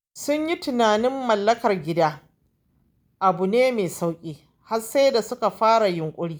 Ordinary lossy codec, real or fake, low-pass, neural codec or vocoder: none; real; none; none